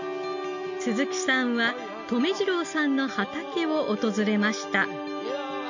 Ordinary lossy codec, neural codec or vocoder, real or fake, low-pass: none; none; real; 7.2 kHz